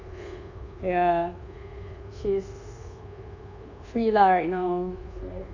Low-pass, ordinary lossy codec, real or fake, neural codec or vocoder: 7.2 kHz; none; fake; codec, 24 kHz, 1.2 kbps, DualCodec